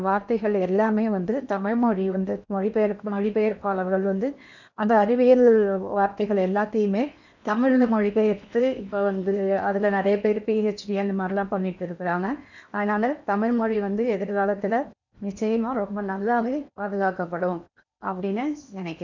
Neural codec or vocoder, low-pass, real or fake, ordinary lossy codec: codec, 16 kHz in and 24 kHz out, 0.8 kbps, FocalCodec, streaming, 65536 codes; 7.2 kHz; fake; none